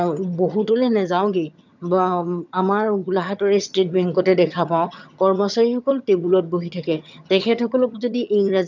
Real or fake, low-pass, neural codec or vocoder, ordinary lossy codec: fake; 7.2 kHz; vocoder, 22.05 kHz, 80 mel bands, HiFi-GAN; none